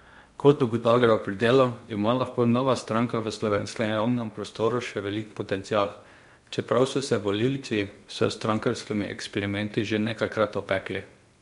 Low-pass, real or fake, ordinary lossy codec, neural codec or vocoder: 10.8 kHz; fake; MP3, 64 kbps; codec, 16 kHz in and 24 kHz out, 0.8 kbps, FocalCodec, streaming, 65536 codes